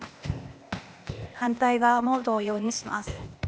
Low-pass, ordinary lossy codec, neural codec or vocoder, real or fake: none; none; codec, 16 kHz, 0.8 kbps, ZipCodec; fake